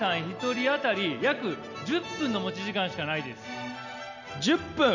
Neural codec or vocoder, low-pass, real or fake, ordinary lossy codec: none; 7.2 kHz; real; none